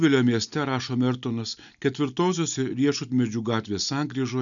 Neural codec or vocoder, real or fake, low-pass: none; real; 7.2 kHz